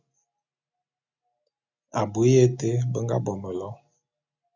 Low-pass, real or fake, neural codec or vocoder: 7.2 kHz; real; none